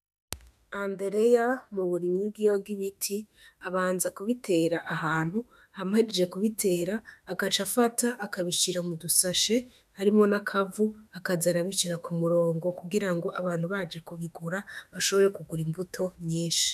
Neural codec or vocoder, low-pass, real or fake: autoencoder, 48 kHz, 32 numbers a frame, DAC-VAE, trained on Japanese speech; 14.4 kHz; fake